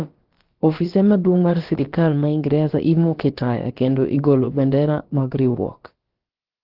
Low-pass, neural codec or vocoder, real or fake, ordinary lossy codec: 5.4 kHz; codec, 16 kHz, about 1 kbps, DyCAST, with the encoder's durations; fake; Opus, 16 kbps